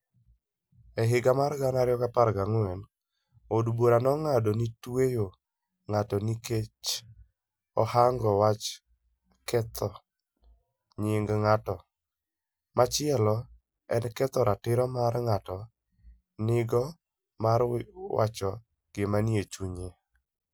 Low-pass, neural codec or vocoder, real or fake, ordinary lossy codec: none; none; real; none